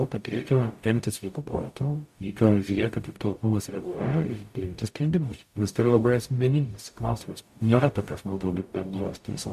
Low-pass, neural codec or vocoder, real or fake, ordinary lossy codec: 14.4 kHz; codec, 44.1 kHz, 0.9 kbps, DAC; fake; MP3, 64 kbps